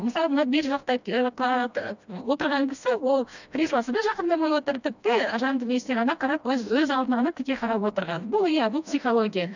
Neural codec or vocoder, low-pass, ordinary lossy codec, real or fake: codec, 16 kHz, 1 kbps, FreqCodec, smaller model; 7.2 kHz; none; fake